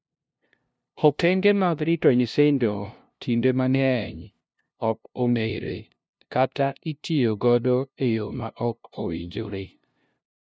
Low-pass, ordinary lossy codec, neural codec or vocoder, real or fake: none; none; codec, 16 kHz, 0.5 kbps, FunCodec, trained on LibriTTS, 25 frames a second; fake